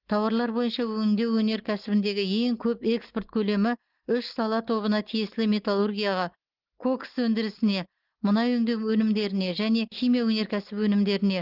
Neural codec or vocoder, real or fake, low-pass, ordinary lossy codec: none; real; 5.4 kHz; Opus, 16 kbps